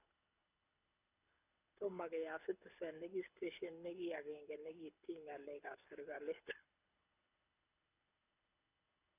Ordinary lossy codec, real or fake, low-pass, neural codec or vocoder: none; fake; 3.6 kHz; codec, 24 kHz, 6 kbps, HILCodec